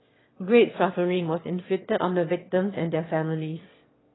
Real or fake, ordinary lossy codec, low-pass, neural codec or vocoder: fake; AAC, 16 kbps; 7.2 kHz; autoencoder, 22.05 kHz, a latent of 192 numbers a frame, VITS, trained on one speaker